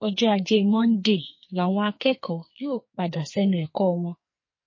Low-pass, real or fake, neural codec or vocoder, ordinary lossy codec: 7.2 kHz; fake; codec, 44.1 kHz, 2.6 kbps, SNAC; MP3, 32 kbps